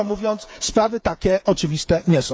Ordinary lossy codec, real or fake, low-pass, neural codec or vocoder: none; fake; none; codec, 16 kHz, 8 kbps, FreqCodec, smaller model